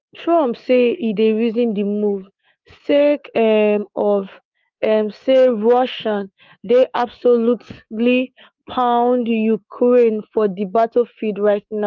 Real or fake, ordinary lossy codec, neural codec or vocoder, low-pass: fake; Opus, 24 kbps; codec, 24 kHz, 3.1 kbps, DualCodec; 7.2 kHz